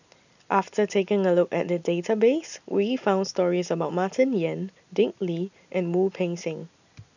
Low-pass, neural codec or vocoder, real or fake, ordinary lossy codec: 7.2 kHz; none; real; none